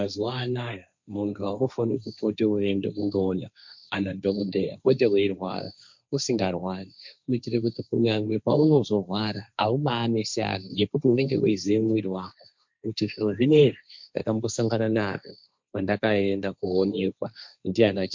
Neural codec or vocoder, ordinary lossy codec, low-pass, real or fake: codec, 16 kHz, 1.1 kbps, Voila-Tokenizer; MP3, 64 kbps; 7.2 kHz; fake